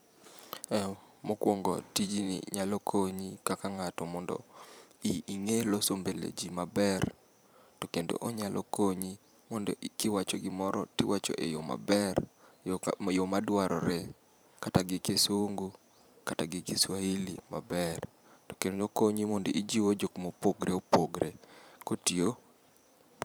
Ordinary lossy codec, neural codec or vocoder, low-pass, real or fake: none; vocoder, 44.1 kHz, 128 mel bands every 512 samples, BigVGAN v2; none; fake